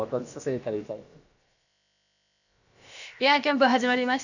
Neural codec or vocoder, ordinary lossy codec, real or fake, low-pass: codec, 16 kHz, about 1 kbps, DyCAST, with the encoder's durations; none; fake; 7.2 kHz